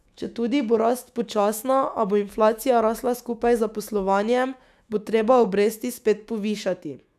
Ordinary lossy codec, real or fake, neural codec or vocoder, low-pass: none; fake; autoencoder, 48 kHz, 128 numbers a frame, DAC-VAE, trained on Japanese speech; 14.4 kHz